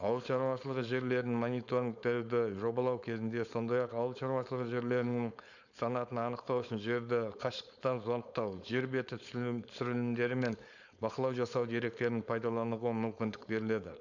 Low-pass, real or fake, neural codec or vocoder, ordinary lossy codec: 7.2 kHz; fake; codec, 16 kHz, 4.8 kbps, FACodec; none